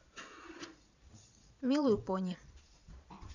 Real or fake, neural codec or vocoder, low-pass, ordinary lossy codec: fake; vocoder, 22.05 kHz, 80 mel bands, Vocos; 7.2 kHz; none